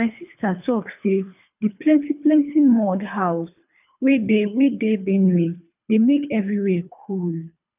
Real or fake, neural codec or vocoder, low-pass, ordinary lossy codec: fake; codec, 24 kHz, 3 kbps, HILCodec; 3.6 kHz; none